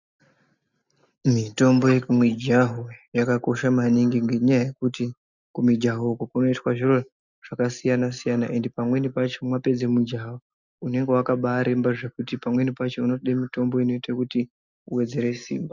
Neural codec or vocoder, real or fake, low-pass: none; real; 7.2 kHz